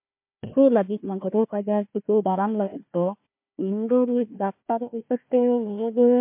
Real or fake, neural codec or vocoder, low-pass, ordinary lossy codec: fake; codec, 16 kHz, 1 kbps, FunCodec, trained on Chinese and English, 50 frames a second; 3.6 kHz; MP3, 32 kbps